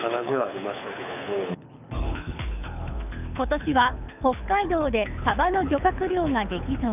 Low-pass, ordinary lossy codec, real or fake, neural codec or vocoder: 3.6 kHz; none; fake; codec, 24 kHz, 6 kbps, HILCodec